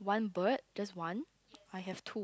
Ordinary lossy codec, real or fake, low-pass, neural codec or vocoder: none; real; none; none